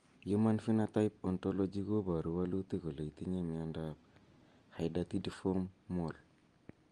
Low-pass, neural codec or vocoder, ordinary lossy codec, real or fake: 9.9 kHz; none; Opus, 24 kbps; real